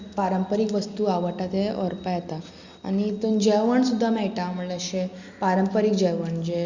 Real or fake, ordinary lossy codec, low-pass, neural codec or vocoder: real; Opus, 64 kbps; 7.2 kHz; none